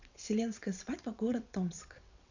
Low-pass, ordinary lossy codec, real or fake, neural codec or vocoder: 7.2 kHz; none; fake; vocoder, 22.05 kHz, 80 mel bands, WaveNeXt